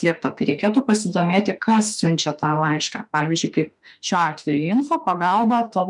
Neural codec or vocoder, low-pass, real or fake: autoencoder, 48 kHz, 32 numbers a frame, DAC-VAE, trained on Japanese speech; 10.8 kHz; fake